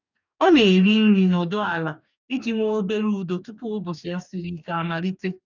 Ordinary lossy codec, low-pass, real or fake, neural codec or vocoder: none; 7.2 kHz; fake; codec, 44.1 kHz, 2.6 kbps, DAC